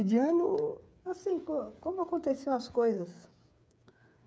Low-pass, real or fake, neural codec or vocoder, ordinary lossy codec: none; fake; codec, 16 kHz, 8 kbps, FreqCodec, smaller model; none